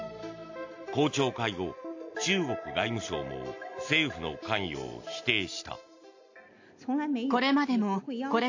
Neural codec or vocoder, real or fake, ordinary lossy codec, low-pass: none; real; AAC, 48 kbps; 7.2 kHz